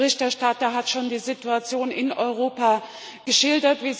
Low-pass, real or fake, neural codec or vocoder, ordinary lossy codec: none; real; none; none